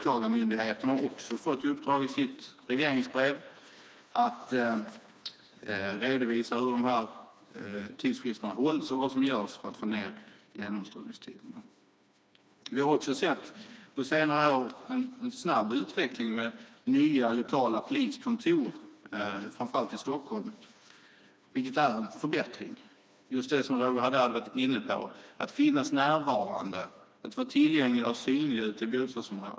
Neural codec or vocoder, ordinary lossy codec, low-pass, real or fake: codec, 16 kHz, 2 kbps, FreqCodec, smaller model; none; none; fake